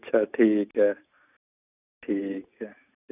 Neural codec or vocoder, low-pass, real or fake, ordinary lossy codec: none; 3.6 kHz; real; none